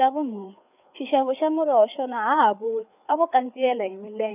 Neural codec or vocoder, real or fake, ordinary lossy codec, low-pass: codec, 16 kHz, 4 kbps, FreqCodec, larger model; fake; none; 3.6 kHz